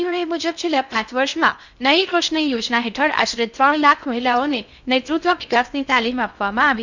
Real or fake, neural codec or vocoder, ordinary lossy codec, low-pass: fake; codec, 16 kHz in and 24 kHz out, 0.6 kbps, FocalCodec, streaming, 2048 codes; none; 7.2 kHz